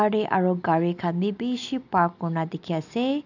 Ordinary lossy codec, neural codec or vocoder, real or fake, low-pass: none; none; real; 7.2 kHz